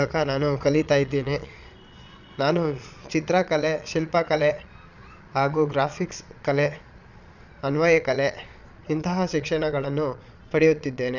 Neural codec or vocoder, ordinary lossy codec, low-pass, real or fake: vocoder, 22.05 kHz, 80 mel bands, Vocos; none; 7.2 kHz; fake